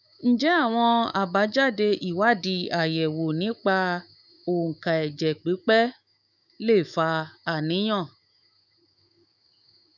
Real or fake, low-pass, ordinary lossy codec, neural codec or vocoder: fake; 7.2 kHz; none; autoencoder, 48 kHz, 128 numbers a frame, DAC-VAE, trained on Japanese speech